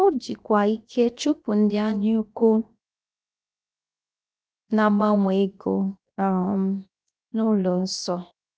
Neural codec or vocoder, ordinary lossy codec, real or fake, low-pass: codec, 16 kHz, 0.7 kbps, FocalCodec; none; fake; none